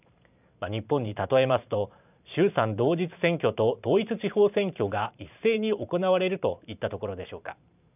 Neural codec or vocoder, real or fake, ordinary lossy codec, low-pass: vocoder, 44.1 kHz, 128 mel bands every 512 samples, BigVGAN v2; fake; none; 3.6 kHz